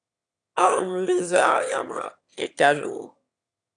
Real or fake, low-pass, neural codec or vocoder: fake; 9.9 kHz; autoencoder, 22.05 kHz, a latent of 192 numbers a frame, VITS, trained on one speaker